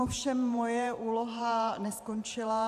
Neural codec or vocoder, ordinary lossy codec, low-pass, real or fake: vocoder, 44.1 kHz, 128 mel bands every 512 samples, BigVGAN v2; AAC, 96 kbps; 14.4 kHz; fake